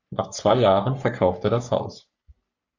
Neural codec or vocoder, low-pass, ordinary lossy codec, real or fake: codec, 44.1 kHz, 3.4 kbps, Pupu-Codec; 7.2 kHz; Opus, 64 kbps; fake